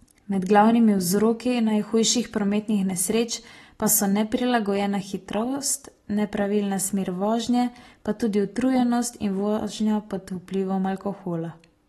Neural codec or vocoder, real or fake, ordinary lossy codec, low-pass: none; real; AAC, 32 kbps; 19.8 kHz